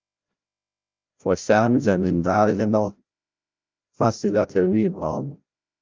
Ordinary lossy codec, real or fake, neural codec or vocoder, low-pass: Opus, 24 kbps; fake; codec, 16 kHz, 0.5 kbps, FreqCodec, larger model; 7.2 kHz